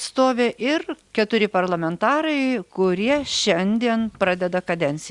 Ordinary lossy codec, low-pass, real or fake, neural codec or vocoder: Opus, 64 kbps; 10.8 kHz; real; none